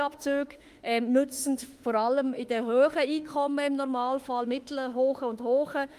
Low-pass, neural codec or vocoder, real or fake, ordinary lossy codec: 14.4 kHz; autoencoder, 48 kHz, 32 numbers a frame, DAC-VAE, trained on Japanese speech; fake; Opus, 32 kbps